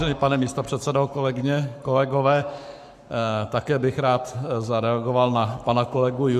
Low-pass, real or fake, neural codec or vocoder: 14.4 kHz; fake; codec, 44.1 kHz, 7.8 kbps, Pupu-Codec